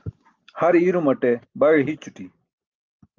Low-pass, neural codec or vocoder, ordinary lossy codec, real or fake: 7.2 kHz; none; Opus, 24 kbps; real